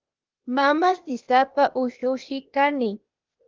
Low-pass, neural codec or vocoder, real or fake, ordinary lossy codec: 7.2 kHz; codec, 16 kHz, 0.8 kbps, ZipCodec; fake; Opus, 32 kbps